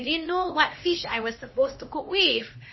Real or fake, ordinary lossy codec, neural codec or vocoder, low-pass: fake; MP3, 24 kbps; codec, 16 kHz, 2 kbps, X-Codec, HuBERT features, trained on LibriSpeech; 7.2 kHz